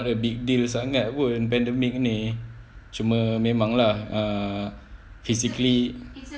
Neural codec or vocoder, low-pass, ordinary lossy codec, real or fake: none; none; none; real